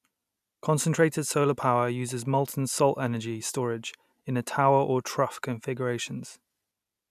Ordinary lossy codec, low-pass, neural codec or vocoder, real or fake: none; 14.4 kHz; none; real